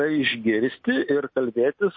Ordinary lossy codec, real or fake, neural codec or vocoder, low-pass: MP3, 32 kbps; real; none; 7.2 kHz